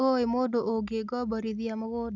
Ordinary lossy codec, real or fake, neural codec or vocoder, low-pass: none; real; none; 7.2 kHz